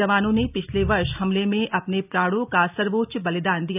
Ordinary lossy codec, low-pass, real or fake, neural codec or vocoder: none; 3.6 kHz; real; none